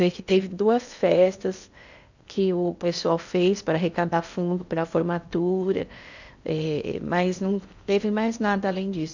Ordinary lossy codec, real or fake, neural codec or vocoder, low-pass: none; fake; codec, 16 kHz in and 24 kHz out, 0.8 kbps, FocalCodec, streaming, 65536 codes; 7.2 kHz